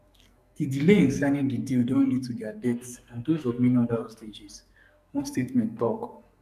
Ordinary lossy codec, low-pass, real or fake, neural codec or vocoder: none; 14.4 kHz; fake; codec, 44.1 kHz, 2.6 kbps, SNAC